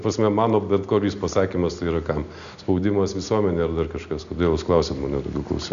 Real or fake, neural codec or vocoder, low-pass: real; none; 7.2 kHz